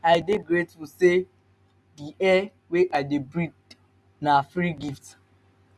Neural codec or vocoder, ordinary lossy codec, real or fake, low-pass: none; none; real; none